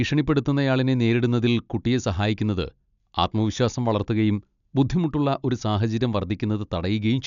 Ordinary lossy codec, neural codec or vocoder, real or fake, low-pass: none; none; real; 7.2 kHz